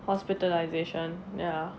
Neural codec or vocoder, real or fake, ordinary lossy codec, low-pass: none; real; none; none